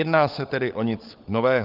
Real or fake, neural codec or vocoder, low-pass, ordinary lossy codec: fake; codec, 16 kHz, 16 kbps, FunCodec, trained on LibriTTS, 50 frames a second; 5.4 kHz; Opus, 24 kbps